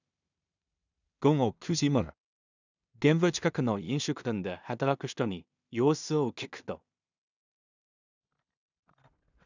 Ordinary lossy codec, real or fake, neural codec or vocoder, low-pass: none; fake; codec, 16 kHz in and 24 kHz out, 0.4 kbps, LongCat-Audio-Codec, two codebook decoder; 7.2 kHz